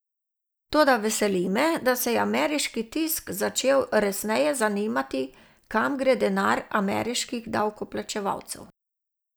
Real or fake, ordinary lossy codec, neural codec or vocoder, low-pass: real; none; none; none